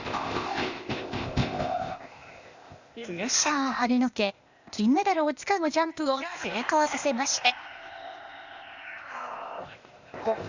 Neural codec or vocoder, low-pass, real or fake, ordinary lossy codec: codec, 16 kHz, 0.8 kbps, ZipCodec; 7.2 kHz; fake; Opus, 64 kbps